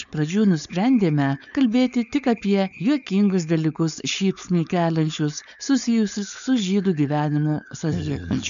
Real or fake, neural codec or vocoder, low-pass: fake; codec, 16 kHz, 4.8 kbps, FACodec; 7.2 kHz